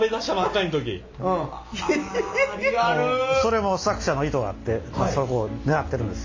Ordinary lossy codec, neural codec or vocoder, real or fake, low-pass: AAC, 48 kbps; none; real; 7.2 kHz